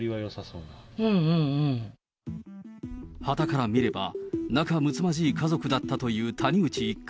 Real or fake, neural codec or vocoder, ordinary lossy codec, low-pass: real; none; none; none